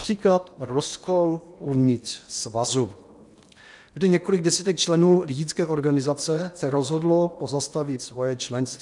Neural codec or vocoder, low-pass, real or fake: codec, 16 kHz in and 24 kHz out, 0.8 kbps, FocalCodec, streaming, 65536 codes; 10.8 kHz; fake